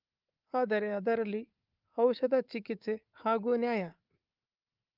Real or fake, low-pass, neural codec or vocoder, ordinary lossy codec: fake; 5.4 kHz; codec, 24 kHz, 3.1 kbps, DualCodec; Opus, 32 kbps